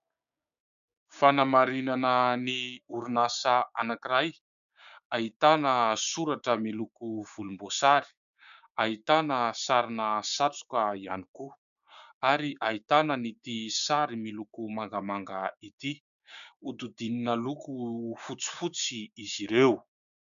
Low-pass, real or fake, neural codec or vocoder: 7.2 kHz; fake; codec, 16 kHz, 6 kbps, DAC